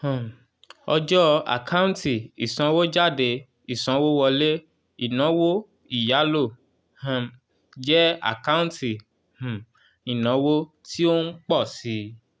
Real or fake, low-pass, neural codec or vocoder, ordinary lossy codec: real; none; none; none